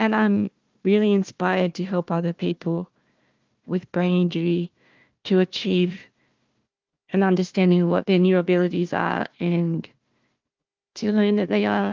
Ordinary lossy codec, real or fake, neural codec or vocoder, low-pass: Opus, 24 kbps; fake; codec, 16 kHz, 1 kbps, FunCodec, trained on Chinese and English, 50 frames a second; 7.2 kHz